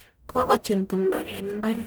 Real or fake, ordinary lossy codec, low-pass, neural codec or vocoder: fake; none; none; codec, 44.1 kHz, 0.9 kbps, DAC